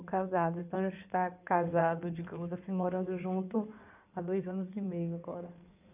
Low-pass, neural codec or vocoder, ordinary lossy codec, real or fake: 3.6 kHz; codec, 16 kHz in and 24 kHz out, 2.2 kbps, FireRedTTS-2 codec; none; fake